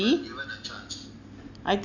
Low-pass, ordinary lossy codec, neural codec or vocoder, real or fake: 7.2 kHz; none; none; real